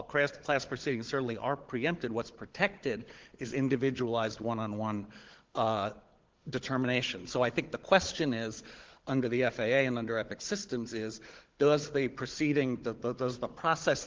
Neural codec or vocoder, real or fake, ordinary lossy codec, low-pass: codec, 16 kHz, 16 kbps, FunCodec, trained on Chinese and English, 50 frames a second; fake; Opus, 16 kbps; 7.2 kHz